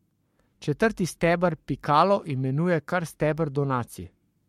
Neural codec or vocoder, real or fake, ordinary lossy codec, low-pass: codec, 44.1 kHz, 7.8 kbps, Pupu-Codec; fake; MP3, 64 kbps; 19.8 kHz